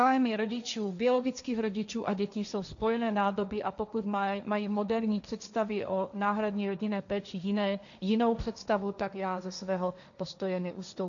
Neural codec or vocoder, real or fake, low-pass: codec, 16 kHz, 1.1 kbps, Voila-Tokenizer; fake; 7.2 kHz